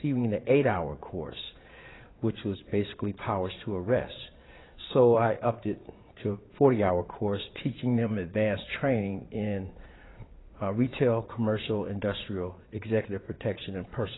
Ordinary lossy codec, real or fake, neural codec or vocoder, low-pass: AAC, 16 kbps; fake; vocoder, 22.05 kHz, 80 mel bands, Vocos; 7.2 kHz